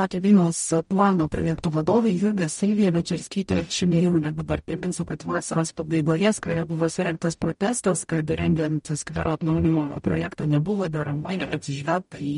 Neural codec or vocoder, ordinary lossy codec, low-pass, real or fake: codec, 44.1 kHz, 0.9 kbps, DAC; MP3, 48 kbps; 19.8 kHz; fake